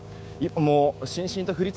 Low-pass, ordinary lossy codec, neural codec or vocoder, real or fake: none; none; codec, 16 kHz, 6 kbps, DAC; fake